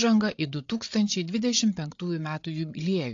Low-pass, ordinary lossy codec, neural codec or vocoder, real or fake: 7.2 kHz; AAC, 48 kbps; codec, 16 kHz, 8 kbps, FreqCodec, larger model; fake